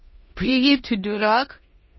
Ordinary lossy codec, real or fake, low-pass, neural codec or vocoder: MP3, 24 kbps; fake; 7.2 kHz; codec, 16 kHz in and 24 kHz out, 0.9 kbps, LongCat-Audio-Codec, four codebook decoder